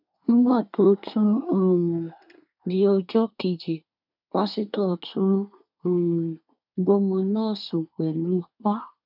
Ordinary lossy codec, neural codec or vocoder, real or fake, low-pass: none; codec, 24 kHz, 1 kbps, SNAC; fake; 5.4 kHz